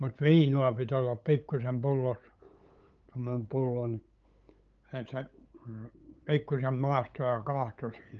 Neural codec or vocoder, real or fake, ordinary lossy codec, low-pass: codec, 16 kHz, 8 kbps, FunCodec, trained on LibriTTS, 25 frames a second; fake; Opus, 24 kbps; 7.2 kHz